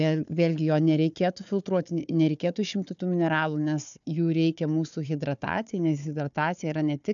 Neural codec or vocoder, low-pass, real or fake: codec, 16 kHz, 4 kbps, FunCodec, trained on Chinese and English, 50 frames a second; 7.2 kHz; fake